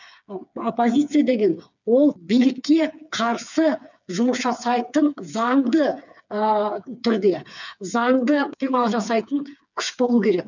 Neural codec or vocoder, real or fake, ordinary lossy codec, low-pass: codec, 16 kHz, 4 kbps, FreqCodec, smaller model; fake; none; 7.2 kHz